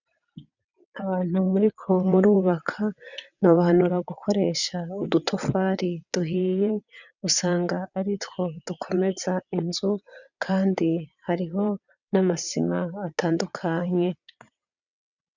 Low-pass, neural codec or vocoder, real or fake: 7.2 kHz; vocoder, 22.05 kHz, 80 mel bands, WaveNeXt; fake